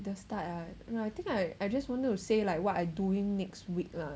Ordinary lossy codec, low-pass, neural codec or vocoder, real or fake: none; none; none; real